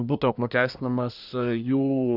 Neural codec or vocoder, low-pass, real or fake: codec, 24 kHz, 1 kbps, SNAC; 5.4 kHz; fake